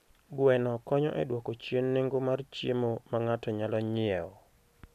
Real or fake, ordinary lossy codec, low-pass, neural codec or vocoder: real; none; 14.4 kHz; none